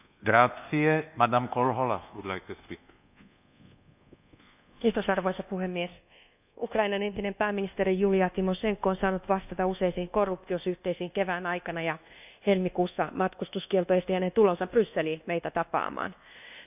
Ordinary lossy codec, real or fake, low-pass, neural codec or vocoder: none; fake; 3.6 kHz; codec, 24 kHz, 1.2 kbps, DualCodec